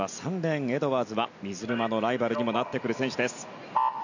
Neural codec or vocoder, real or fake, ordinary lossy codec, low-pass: none; real; none; 7.2 kHz